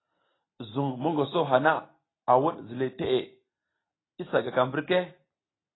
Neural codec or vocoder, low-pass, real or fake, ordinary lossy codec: none; 7.2 kHz; real; AAC, 16 kbps